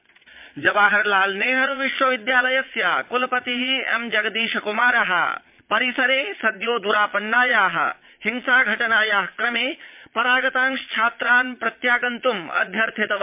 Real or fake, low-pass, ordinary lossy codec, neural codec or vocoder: fake; 3.6 kHz; MP3, 32 kbps; vocoder, 22.05 kHz, 80 mel bands, Vocos